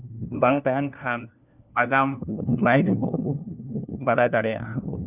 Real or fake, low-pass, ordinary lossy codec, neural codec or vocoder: fake; 3.6 kHz; none; codec, 16 kHz, 1 kbps, FunCodec, trained on LibriTTS, 50 frames a second